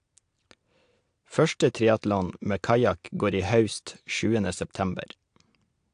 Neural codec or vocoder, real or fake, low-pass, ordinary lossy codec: none; real; 9.9 kHz; AAC, 64 kbps